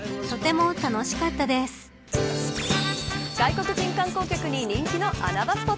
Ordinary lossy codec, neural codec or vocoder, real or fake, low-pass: none; none; real; none